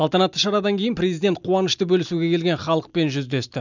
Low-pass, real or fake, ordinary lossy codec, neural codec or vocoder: 7.2 kHz; real; none; none